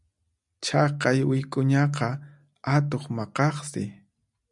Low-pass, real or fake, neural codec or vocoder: 10.8 kHz; real; none